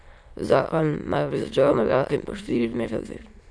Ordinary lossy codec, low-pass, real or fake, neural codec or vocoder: none; none; fake; autoencoder, 22.05 kHz, a latent of 192 numbers a frame, VITS, trained on many speakers